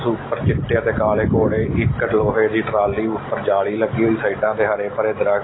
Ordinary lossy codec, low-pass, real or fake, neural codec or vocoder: AAC, 16 kbps; 7.2 kHz; real; none